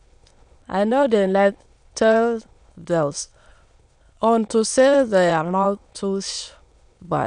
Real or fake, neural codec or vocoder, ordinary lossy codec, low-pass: fake; autoencoder, 22.05 kHz, a latent of 192 numbers a frame, VITS, trained on many speakers; none; 9.9 kHz